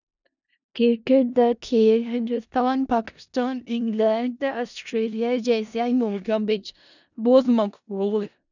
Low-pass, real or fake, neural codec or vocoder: 7.2 kHz; fake; codec, 16 kHz in and 24 kHz out, 0.4 kbps, LongCat-Audio-Codec, four codebook decoder